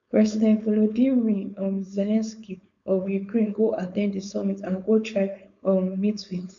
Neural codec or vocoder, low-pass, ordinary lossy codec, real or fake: codec, 16 kHz, 4.8 kbps, FACodec; 7.2 kHz; none; fake